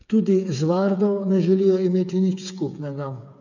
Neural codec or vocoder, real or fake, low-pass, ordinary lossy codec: codec, 16 kHz, 8 kbps, FreqCodec, smaller model; fake; 7.2 kHz; none